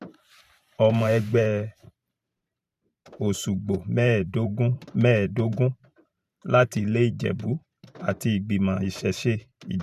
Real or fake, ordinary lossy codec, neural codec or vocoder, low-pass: fake; none; vocoder, 44.1 kHz, 128 mel bands every 512 samples, BigVGAN v2; 14.4 kHz